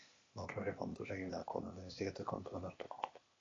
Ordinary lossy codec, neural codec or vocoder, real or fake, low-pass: AAC, 32 kbps; codec, 16 kHz, 0.8 kbps, ZipCodec; fake; 7.2 kHz